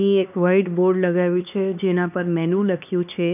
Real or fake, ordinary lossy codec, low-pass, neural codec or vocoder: fake; none; 3.6 kHz; codec, 16 kHz, 2 kbps, X-Codec, HuBERT features, trained on LibriSpeech